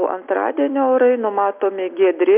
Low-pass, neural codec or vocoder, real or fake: 3.6 kHz; none; real